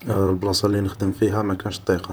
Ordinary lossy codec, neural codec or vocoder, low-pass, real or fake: none; vocoder, 44.1 kHz, 128 mel bands, Pupu-Vocoder; none; fake